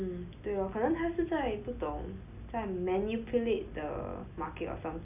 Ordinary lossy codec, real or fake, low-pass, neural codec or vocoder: none; real; 3.6 kHz; none